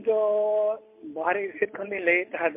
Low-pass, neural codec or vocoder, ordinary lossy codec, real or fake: 3.6 kHz; none; MP3, 24 kbps; real